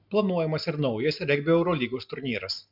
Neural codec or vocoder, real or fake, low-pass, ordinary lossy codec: none; real; 5.4 kHz; MP3, 48 kbps